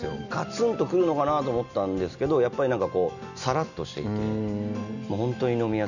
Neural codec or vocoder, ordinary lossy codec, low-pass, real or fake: none; none; 7.2 kHz; real